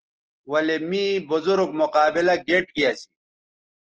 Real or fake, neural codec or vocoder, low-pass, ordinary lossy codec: real; none; 7.2 kHz; Opus, 16 kbps